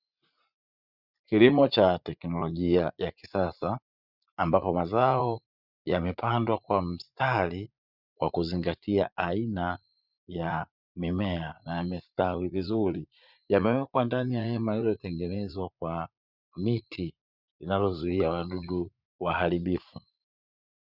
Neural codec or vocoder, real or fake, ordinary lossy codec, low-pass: vocoder, 44.1 kHz, 80 mel bands, Vocos; fake; Opus, 64 kbps; 5.4 kHz